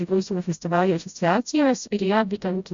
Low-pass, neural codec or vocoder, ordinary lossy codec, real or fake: 7.2 kHz; codec, 16 kHz, 0.5 kbps, FreqCodec, smaller model; Opus, 64 kbps; fake